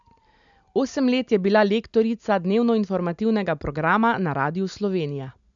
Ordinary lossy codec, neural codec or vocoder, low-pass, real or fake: MP3, 96 kbps; none; 7.2 kHz; real